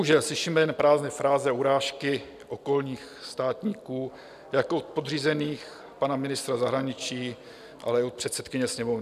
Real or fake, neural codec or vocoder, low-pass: real; none; 14.4 kHz